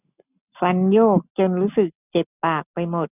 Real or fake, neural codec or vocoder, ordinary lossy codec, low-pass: real; none; none; 3.6 kHz